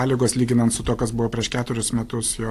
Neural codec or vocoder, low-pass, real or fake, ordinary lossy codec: none; 14.4 kHz; real; AAC, 64 kbps